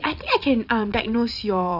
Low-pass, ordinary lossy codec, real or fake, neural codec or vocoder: 5.4 kHz; none; fake; vocoder, 22.05 kHz, 80 mel bands, Vocos